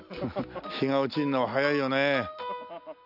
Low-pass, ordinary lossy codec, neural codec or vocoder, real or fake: 5.4 kHz; none; none; real